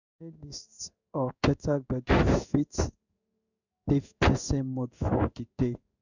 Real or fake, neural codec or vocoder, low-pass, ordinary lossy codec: fake; codec, 16 kHz in and 24 kHz out, 1 kbps, XY-Tokenizer; 7.2 kHz; none